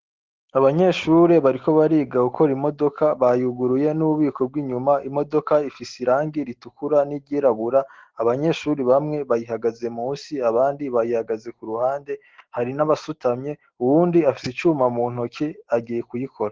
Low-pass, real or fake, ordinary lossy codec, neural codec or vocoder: 7.2 kHz; real; Opus, 16 kbps; none